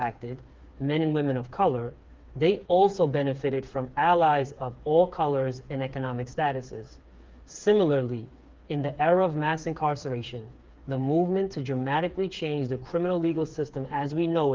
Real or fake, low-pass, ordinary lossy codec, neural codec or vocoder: fake; 7.2 kHz; Opus, 32 kbps; codec, 16 kHz, 4 kbps, FreqCodec, smaller model